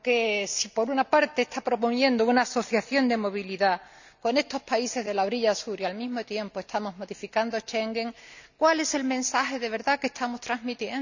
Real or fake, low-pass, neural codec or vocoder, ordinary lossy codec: real; 7.2 kHz; none; none